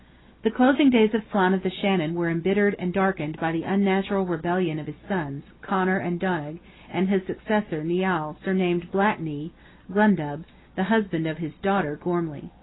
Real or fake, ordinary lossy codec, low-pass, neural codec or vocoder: real; AAC, 16 kbps; 7.2 kHz; none